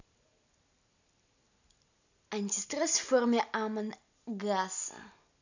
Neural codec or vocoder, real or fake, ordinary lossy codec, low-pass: none; real; none; 7.2 kHz